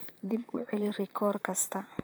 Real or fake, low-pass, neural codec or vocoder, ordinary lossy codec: fake; none; vocoder, 44.1 kHz, 128 mel bands, Pupu-Vocoder; none